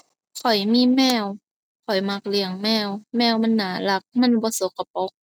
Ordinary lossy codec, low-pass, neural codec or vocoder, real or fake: none; none; none; real